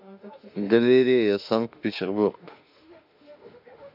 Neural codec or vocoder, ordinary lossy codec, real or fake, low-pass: autoencoder, 48 kHz, 32 numbers a frame, DAC-VAE, trained on Japanese speech; none; fake; 5.4 kHz